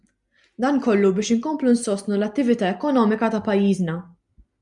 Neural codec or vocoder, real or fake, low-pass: none; real; 10.8 kHz